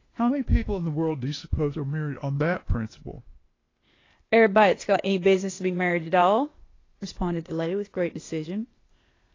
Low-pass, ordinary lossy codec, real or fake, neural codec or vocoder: 7.2 kHz; AAC, 32 kbps; fake; codec, 16 kHz, 0.8 kbps, ZipCodec